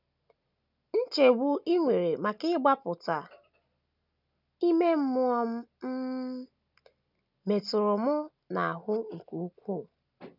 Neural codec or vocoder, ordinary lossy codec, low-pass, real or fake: none; none; 5.4 kHz; real